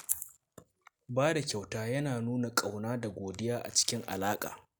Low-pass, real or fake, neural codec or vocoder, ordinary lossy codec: none; real; none; none